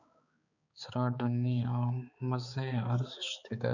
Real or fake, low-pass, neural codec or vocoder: fake; 7.2 kHz; codec, 16 kHz, 4 kbps, X-Codec, HuBERT features, trained on balanced general audio